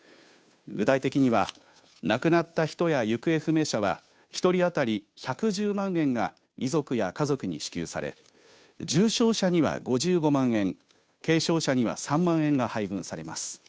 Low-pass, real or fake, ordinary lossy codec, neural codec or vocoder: none; fake; none; codec, 16 kHz, 2 kbps, FunCodec, trained on Chinese and English, 25 frames a second